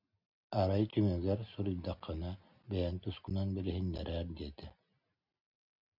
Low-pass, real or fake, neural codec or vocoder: 5.4 kHz; real; none